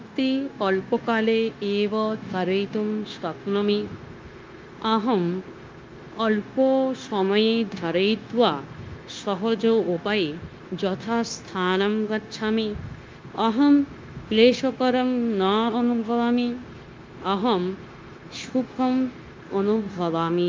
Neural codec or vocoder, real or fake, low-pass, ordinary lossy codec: codec, 16 kHz, 0.9 kbps, LongCat-Audio-Codec; fake; 7.2 kHz; Opus, 32 kbps